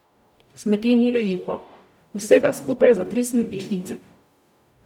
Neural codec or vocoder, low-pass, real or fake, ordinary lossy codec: codec, 44.1 kHz, 0.9 kbps, DAC; 19.8 kHz; fake; none